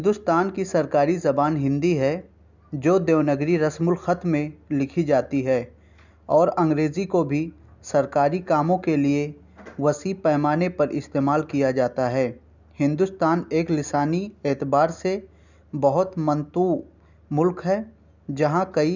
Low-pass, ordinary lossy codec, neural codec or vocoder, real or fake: 7.2 kHz; none; none; real